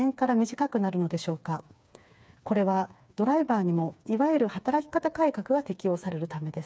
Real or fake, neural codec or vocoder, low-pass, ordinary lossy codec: fake; codec, 16 kHz, 4 kbps, FreqCodec, smaller model; none; none